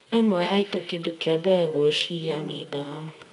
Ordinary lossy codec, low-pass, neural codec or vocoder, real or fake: none; 10.8 kHz; codec, 24 kHz, 0.9 kbps, WavTokenizer, medium music audio release; fake